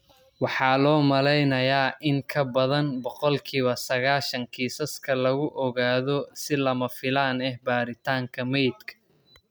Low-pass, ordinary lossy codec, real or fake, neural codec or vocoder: none; none; real; none